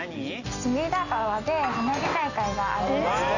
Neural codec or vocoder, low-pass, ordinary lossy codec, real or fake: none; 7.2 kHz; none; real